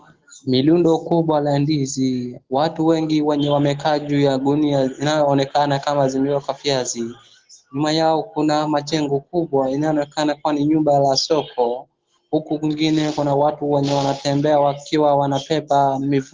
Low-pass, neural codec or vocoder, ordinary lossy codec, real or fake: 7.2 kHz; none; Opus, 16 kbps; real